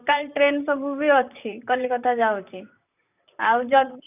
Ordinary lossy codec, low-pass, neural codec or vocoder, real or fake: none; 3.6 kHz; vocoder, 44.1 kHz, 128 mel bands, Pupu-Vocoder; fake